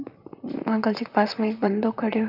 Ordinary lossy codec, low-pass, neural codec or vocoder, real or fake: none; 5.4 kHz; vocoder, 44.1 kHz, 128 mel bands, Pupu-Vocoder; fake